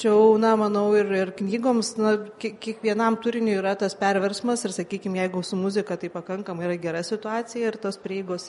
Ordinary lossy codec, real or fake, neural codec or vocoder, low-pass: MP3, 48 kbps; real; none; 19.8 kHz